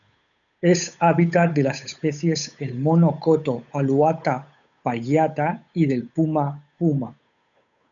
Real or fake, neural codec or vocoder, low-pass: fake; codec, 16 kHz, 8 kbps, FunCodec, trained on Chinese and English, 25 frames a second; 7.2 kHz